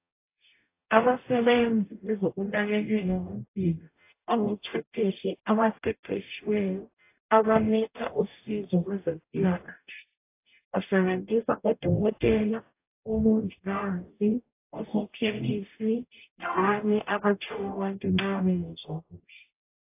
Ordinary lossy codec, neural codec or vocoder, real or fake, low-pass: AAC, 24 kbps; codec, 44.1 kHz, 0.9 kbps, DAC; fake; 3.6 kHz